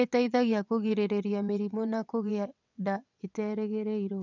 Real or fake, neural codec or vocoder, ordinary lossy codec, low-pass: fake; vocoder, 22.05 kHz, 80 mel bands, WaveNeXt; none; 7.2 kHz